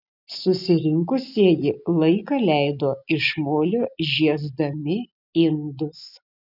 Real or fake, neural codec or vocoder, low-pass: real; none; 5.4 kHz